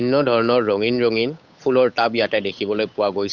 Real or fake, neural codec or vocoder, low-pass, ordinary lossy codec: fake; codec, 16 kHz, 8 kbps, FunCodec, trained on Chinese and English, 25 frames a second; 7.2 kHz; none